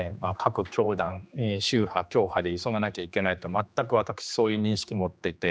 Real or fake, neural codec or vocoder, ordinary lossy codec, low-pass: fake; codec, 16 kHz, 2 kbps, X-Codec, HuBERT features, trained on general audio; none; none